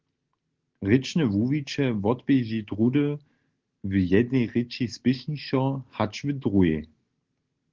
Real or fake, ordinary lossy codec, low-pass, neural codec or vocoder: real; Opus, 16 kbps; 7.2 kHz; none